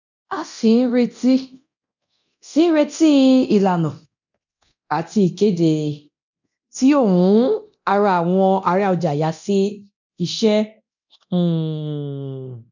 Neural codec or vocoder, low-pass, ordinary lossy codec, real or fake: codec, 24 kHz, 0.9 kbps, DualCodec; 7.2 kHz; none; fake